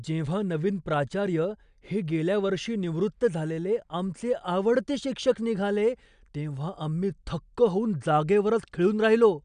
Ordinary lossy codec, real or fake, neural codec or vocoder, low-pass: none; real; none; 9.9 kHz